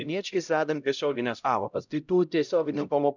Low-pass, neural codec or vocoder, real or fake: 7.2 kHz; codec, 16 kHz, 0.5 kbps, X-Codec, HuBERT features, trained on LibriSpeech; fake